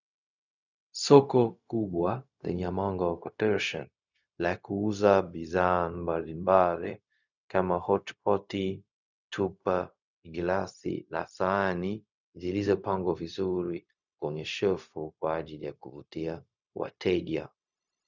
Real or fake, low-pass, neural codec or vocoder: fake; 7.2 kHz; codec, 16 kHz, 0.4 kbps, LongCat-Audio-Codec